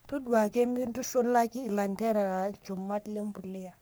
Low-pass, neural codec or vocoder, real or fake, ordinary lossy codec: none; codec, 44.1 kHz, 3.4 kbps, Pupu-Codec; fake; none